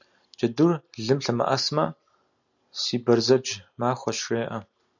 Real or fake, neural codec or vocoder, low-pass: real; none; 7.2 kHz